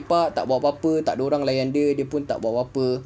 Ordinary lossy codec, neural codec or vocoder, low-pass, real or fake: none; none; none; real